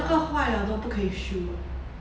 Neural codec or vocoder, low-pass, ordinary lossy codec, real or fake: none; none; none; real